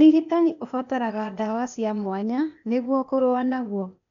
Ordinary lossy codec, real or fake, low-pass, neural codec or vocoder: none; fake; 7.2 kHz; codec, 16 kHz, 0.8 kbps, ZipCodec